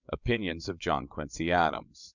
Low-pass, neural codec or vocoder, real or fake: 7.2 kHz; vocoder, 22.05 kHz, 80 mel bands, WaveNeXt; fake